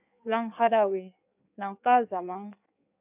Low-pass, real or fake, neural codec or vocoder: 3.6 kHz; fake; codec, 16 kHz in and 24 kHz out, 1.1 kbps, FireRedTTS-2 codec